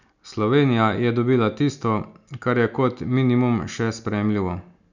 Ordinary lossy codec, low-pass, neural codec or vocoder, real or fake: none; 7.2 kHz; none; real